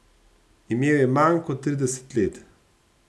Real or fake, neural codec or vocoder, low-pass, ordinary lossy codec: real; none; none; none